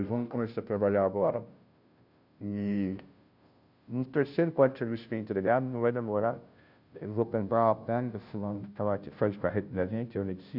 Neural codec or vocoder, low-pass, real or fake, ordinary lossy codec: codec, 16 kHz, 0.5 kbps, FunCodec, trained on Chinese and English, 25 frames a second; 5.4 kHz; fake; none